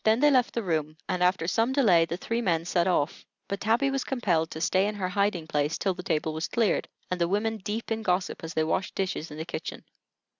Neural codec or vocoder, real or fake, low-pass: none; real; 7.2 kHz